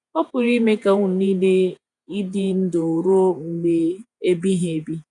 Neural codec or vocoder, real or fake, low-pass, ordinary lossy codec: vocoder, 48 kHz, 128 mel bands, Vocos; fake; 10.8 kHz; none